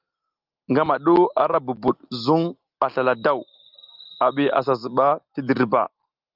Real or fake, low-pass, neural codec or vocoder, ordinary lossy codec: real; 5.4 kHz; none; Opus, 24 kbps